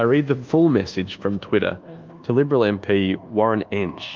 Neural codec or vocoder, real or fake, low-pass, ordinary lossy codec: codec, 24 kHz, 1.2 kbps, DualCodec; fake; 7.2 kHz; Opus, 24 kbps